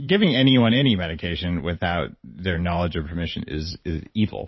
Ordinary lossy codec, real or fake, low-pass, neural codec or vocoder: MP3, 24 kbps; fake; 7.2 kHz; vocoder, 44.1 kHz, 128 mel bands every 512 samples, BigVGAN v2